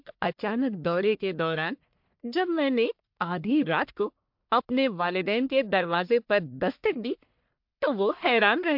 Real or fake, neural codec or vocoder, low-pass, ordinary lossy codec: fake; codec, 44.1 kHz, 1.7 kbps, Pupu-Codec; 5.4 kHz; MP3, 48 kbps